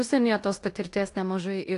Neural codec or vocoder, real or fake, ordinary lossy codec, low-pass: codec, 16 kHz in and 24 kHz out, 0.9 kbps, LongCat-Audio-Codec, fine tuned four codebook decoder; fake; AAC, 48 kbps; 10.8 kHz